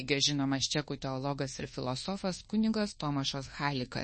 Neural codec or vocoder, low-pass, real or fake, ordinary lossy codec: codec, 24 kHz, 1.2 kbps, DualCodec; 10.8 kHz; fake; MP3, 32 kbps